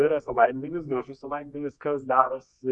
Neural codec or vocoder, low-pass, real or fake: codec, 24 kHz, 0.9 kbps, WavTokenizer, medium music audio release; 10.8 kHz; fake